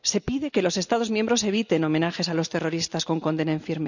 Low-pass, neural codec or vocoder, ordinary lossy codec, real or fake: 7.2 kHz; none; none; real